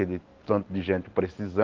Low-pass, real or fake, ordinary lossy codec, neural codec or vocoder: 7.2 kHz; real; Opus, 16 kbps; none